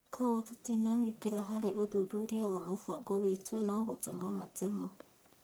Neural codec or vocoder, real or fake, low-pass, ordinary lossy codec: codec, 44.1 kHz, 1.7 kbps, Pupu-Codec; fake; none; none